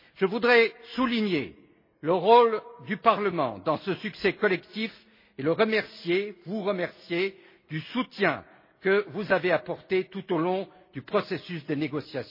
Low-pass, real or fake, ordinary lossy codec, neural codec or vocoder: 5.4 kHz; real; MP3, 24 kbps; none